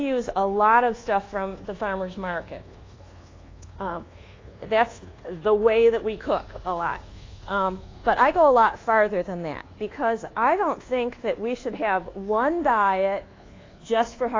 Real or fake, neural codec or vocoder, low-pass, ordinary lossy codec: fake; codec, 24 kHz, 1.2 kbps, DualCodec; 7.2 kHz; AAC, 32 kbps